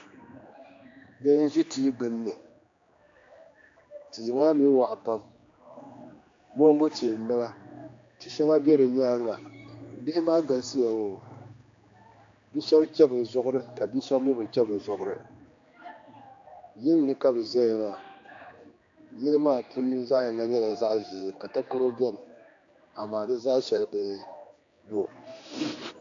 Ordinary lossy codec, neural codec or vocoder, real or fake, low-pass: AAC, 48 kbps; codec, 16 kHz, 2 kbps, X-Codec, HuBERT features, trained on general audio; fake; 7.2 kHz